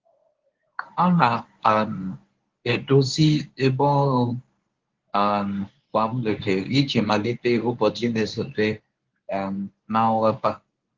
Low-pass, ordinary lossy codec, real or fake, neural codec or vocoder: 7.2 kHz; Opus, 16 kbps; fake; codec, 24 kHz, 0.9 kbps, WavTokenizer, medium speech release version 1